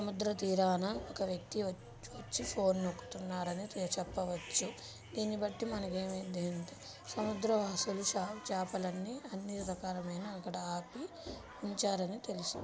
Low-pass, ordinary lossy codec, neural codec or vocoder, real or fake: none; none; none; real